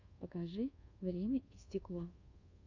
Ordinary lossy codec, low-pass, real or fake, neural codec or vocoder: AAC, 48 kbps; 7.2 kHz; fake; codec, 24 kHz, 1.2 kbps, DualCodec